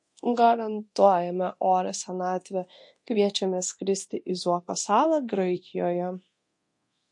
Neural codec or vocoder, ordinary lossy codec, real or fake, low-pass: codec, 24 kHz, 0.9 kbps, DualCodec; MP3, 48 kbps; fake; 10.8 kHz